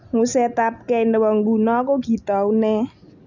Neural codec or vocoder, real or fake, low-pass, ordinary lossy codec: none; real; 7.2 kHz; none